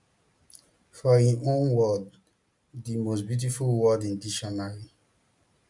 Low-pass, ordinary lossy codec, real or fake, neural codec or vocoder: 10.8 kHz; MP3, 96 kbps; real; none